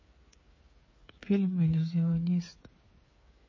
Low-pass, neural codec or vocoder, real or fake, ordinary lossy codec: 7.2 kHz; codec, 16 kHz, 8 kbps, FreqCodec, smaller model; fake; MP3, 32 kbps